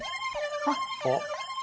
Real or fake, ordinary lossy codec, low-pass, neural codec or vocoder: real; none; none; none